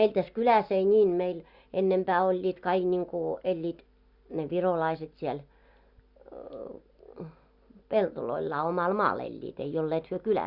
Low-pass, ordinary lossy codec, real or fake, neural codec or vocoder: 5.4 kHz; Opus, 64 kbps; real; none